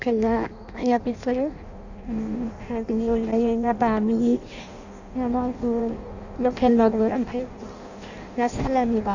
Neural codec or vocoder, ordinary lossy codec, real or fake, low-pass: codec, 16 kHz in and 24 kHz out, 0.6 kbps, FireRedTTS-2 codec; none; fake; 7.2 kHz